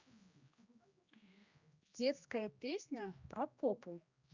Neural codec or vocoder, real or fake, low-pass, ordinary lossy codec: codec, 16 kHz, 1 kbps, X-Codec, HuBERT features, trained on general audio; fake; 7.2 kHz; Opus, 64 kbps